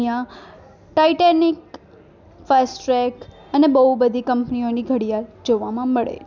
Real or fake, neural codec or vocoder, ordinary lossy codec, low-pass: real; none; none; 7.2 kHz